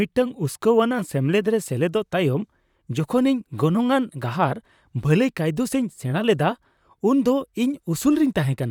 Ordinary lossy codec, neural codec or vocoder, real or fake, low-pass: none; vocoder, 44.1 kHz, 128 mel bands, Pupu-Vocoder; fake; 19.8 kHz